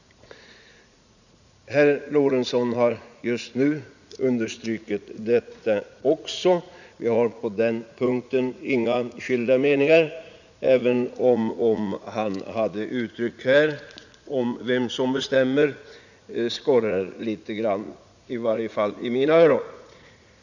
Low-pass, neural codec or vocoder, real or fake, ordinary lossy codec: 7.2 kHz; vocoder, 44.1 kHz, 80 mel bands, Vocos; fake; none